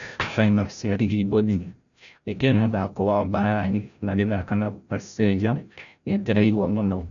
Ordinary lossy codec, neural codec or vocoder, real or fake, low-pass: none; codec, 16 kHz, 0.5 kbps, FreqCodec, larger model; fake; 7.2 kHz